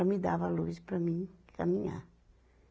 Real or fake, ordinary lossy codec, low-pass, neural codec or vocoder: real; none; none; none